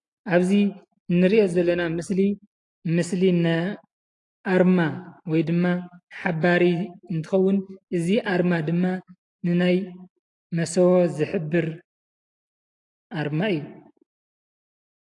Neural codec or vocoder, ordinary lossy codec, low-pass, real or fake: none; AAC, 48 kbps; 10.8 kHz; real